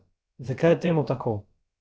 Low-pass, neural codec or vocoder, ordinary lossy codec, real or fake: none; codec, 16 kHz, about 1 kbps, DyCAST, with the encoder's durations; none; fake